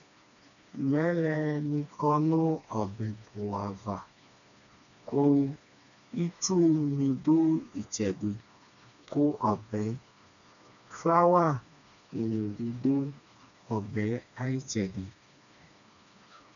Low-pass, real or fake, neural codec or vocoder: 7.2 kHz; fake; codec, 16 kHz, 2 kbps, FreqCodec, smaller model